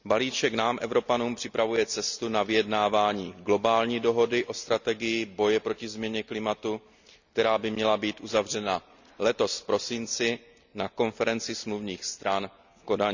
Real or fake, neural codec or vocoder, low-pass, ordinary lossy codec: real; none; 7.2 kHz; none